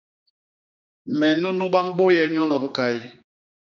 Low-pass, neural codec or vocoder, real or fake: 7.2 kHz; codec, 16 kHz, 2 kbps, X-Codec, HuBERT features, trained on general audio; fake